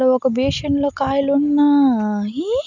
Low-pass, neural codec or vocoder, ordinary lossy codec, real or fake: 7.2 kHz; none; none; real